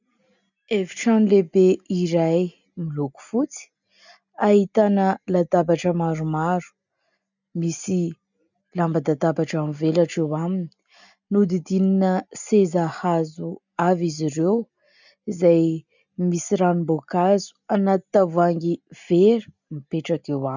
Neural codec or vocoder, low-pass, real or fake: none; 7.2 kHz; real